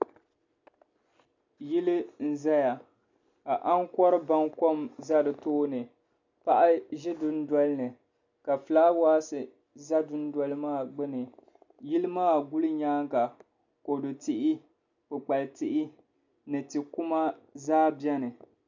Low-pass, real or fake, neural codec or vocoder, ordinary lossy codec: 7.2 kHz; real; none; MP3, 64 kbps